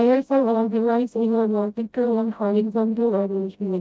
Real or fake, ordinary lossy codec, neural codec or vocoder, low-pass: fake; none; codec, 16 kHz, 0.5 kbps, FreqCodec, smaller model; none